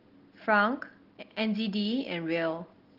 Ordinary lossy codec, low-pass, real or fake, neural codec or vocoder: Opus, 16 kbps; 5.4 kHz; real; none